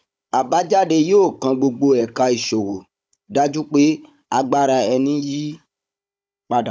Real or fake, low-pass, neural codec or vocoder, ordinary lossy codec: fake; none; codec, 16 kHz, 16 kbps, FunCodec, trained on Chinese and English, 50 frames a second; none